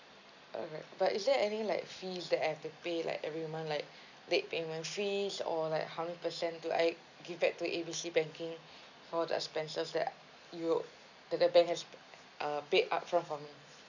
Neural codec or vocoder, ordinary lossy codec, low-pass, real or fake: none; none; 7.2 kHz; real